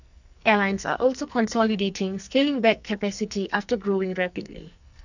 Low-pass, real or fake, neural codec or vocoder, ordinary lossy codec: 7.2 kHz; fake; codec, 44.1 kHz, 2.6 kbps, SNAC; none